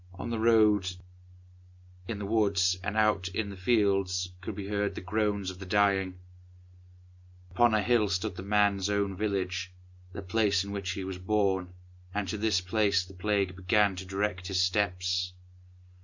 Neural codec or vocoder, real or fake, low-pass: none; real; 7.2 kHz